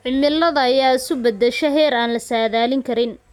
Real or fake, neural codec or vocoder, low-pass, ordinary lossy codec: real; none; 19.8 kHz; none